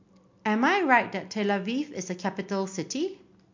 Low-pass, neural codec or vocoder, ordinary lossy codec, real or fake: 7.2 kHz; none; MP3, 48 kbps; real